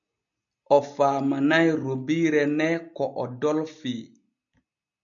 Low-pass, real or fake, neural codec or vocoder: 7.2 kHz; real; none